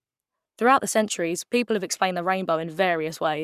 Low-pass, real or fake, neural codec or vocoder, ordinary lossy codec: 14.4 kHz; fake; codec, 44.1 kHz, 7.8 kbps, Pupu-Codec; none